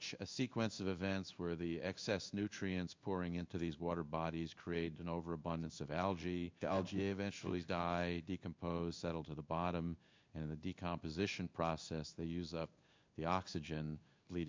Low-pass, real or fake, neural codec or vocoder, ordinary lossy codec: 7.2 kHz; fake; codec, 16 kHz in and 24 kHz out, 1 kbps, XY-Tokenizer; MP3, 64 kbps